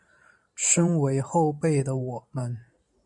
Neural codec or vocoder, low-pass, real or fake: vocoder, 44.1 kHz, 128 mel bands every 512 samples, BigVGAN v2; 10.8 kHz; fake